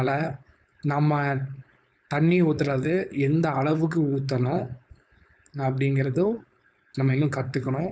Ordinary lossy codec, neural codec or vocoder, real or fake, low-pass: none; codec, 16 kHz, 4.8 kbps, FACodec; fake; none